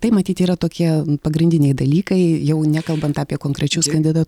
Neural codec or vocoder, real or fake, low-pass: none; real; 19.8 kHz